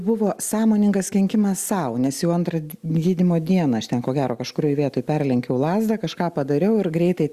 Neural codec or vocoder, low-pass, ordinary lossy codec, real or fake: none; 14.4 kHz; Opus, 64 kbps; real